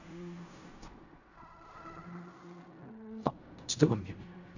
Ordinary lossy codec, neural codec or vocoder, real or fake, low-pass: none; codec, 16 kHz in and 24 kHz out, 0.4 kbps, LongCat-Audio-Codec, fine tuned four codebook decoder; fake; 7.2 kHz